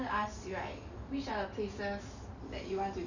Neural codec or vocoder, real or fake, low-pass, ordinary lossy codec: none; real; 7.2 kHz; none